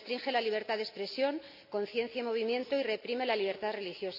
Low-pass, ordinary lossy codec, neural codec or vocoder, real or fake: 5.4 kHz; none; none; real